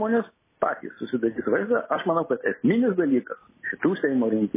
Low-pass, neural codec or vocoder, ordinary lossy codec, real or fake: 3.6 kHz; none; MP3, 16 kbps; real